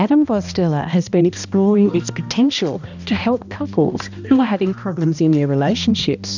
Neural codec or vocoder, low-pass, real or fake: codec, 16 kHz, 1 kbps, X-Codec, HuBERT features, trained on balanced general audio; 7.2 kHz; fake